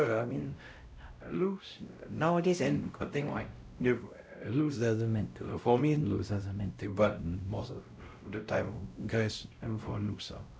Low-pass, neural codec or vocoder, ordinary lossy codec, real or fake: none; codec, 16 kHz, 0.5 kbps, X-Codec, WavLM features, trained on Multilingual LibriSpeech; none; fake